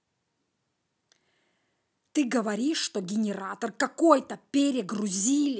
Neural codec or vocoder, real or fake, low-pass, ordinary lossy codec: none; real; none; none